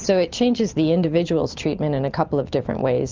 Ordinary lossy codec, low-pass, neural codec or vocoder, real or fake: Opus, 32 kbps; 7.2 kHz; none; real